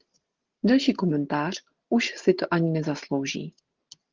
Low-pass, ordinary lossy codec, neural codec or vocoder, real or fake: 7.2 kHz; Opus, 16 kbps; none; real